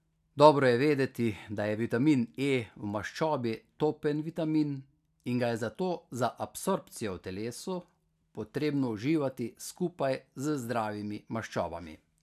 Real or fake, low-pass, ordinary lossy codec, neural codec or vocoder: real; 14.4 kHz; none; none